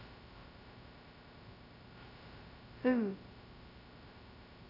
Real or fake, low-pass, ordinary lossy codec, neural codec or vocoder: fake; 5.4 kHz; none; codec, 16 kHz, 0.2 kbps, FocalCodec